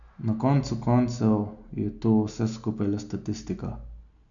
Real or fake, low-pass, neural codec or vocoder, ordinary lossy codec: real; 7.2 kHz; none; none